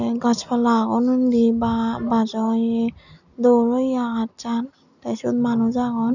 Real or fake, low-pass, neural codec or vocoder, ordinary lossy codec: real; 7.2 kHz; none; none